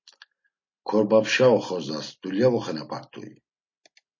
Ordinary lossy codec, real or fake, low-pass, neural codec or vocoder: MP3, 32 kbps; real; 7.2 kHz; none